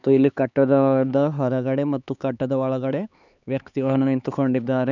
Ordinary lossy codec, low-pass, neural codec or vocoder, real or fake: none; 7.2 kHz; codec, 16 kHz, 4 kbps, X-Codec, HuBERT features, trained on LibriSpeech; fake